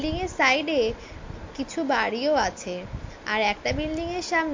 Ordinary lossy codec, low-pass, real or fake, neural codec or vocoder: MP3, 48 kbps; 7.2 kHz; real; none